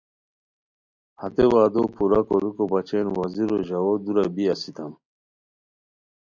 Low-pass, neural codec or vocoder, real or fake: 7.2 kHz; none; real